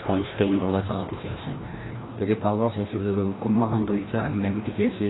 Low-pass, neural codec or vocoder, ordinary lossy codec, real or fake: 7.2 kHz; codec, 16 kHz, 1 kbps, FreqCodec, larger model; AAC, 16 kbps; fake